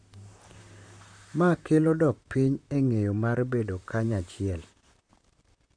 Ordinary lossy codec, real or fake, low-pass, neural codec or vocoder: MP3, 96 kbps; fake; 9.9 kHz; vocoder, 44.1 kHz, 128 mel bands, Pupu-Vocoder